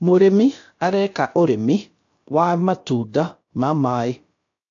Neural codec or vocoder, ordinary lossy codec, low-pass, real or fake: codec, 16 kHz, about 1 kbps, DyCAST, with the encoder's durations; AAC, 48 kbps; 7.2 kHz; fake